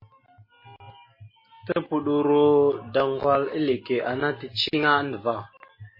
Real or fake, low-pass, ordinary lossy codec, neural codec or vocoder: real; 5.4 kHz; MP3, 24 kbps; none